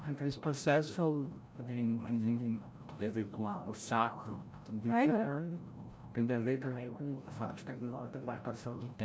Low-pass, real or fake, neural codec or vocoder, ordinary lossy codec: none; fake; codec, 16 kHz, 0.5 kbps, FreqCodec, larger model; none